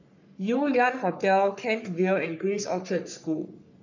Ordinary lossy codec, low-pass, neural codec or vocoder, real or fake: none; 7.2 kHz; codec, 44.1 kHz, 3.4 kbps, Pupu-Codec; fake